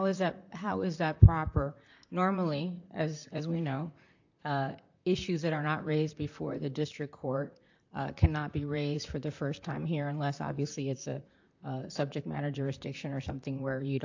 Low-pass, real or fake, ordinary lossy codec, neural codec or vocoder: 7.2 kHz; fake; AAC, 48 kbps; vocoder, 44.1 kHz, 128 mel bands, Pupu-Vocoder